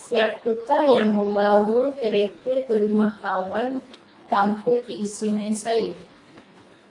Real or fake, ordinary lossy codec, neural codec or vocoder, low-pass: fake; AAC, 48 kbps; codec, 24 kHz, 1.5 kbps, HILCodec; 10.8 kHz